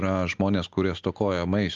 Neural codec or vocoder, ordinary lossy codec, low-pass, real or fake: none; Opus, 32 kbps; 7.2 kHz; real